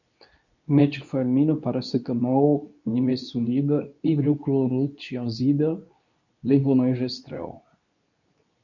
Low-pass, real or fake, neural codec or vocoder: 7.2 kHz; fake; codec, 24 kHz, 0.9 kbps, WavTokenizer, medium speech release version 2